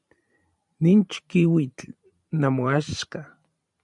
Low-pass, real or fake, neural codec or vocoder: 10.8 kHz; fake; vocoder, 44.1 kHz, 128 mel bands every 512 samples, BigVGAN v2